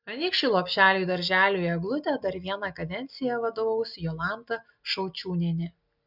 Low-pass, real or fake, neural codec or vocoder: 5.4 kHz; real; none